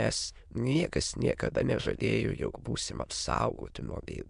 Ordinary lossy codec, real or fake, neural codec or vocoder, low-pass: MP3, 64 kbps; fake; autoencoder, 22.05 kHz, a latent of 192 numbers a frame, VITS, trained on many speakers; 9.9 kHz